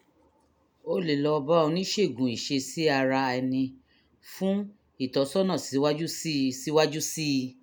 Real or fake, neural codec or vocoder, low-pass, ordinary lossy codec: real; none; none; none